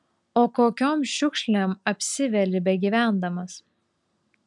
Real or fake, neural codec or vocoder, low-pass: real; none; 10.8 kHz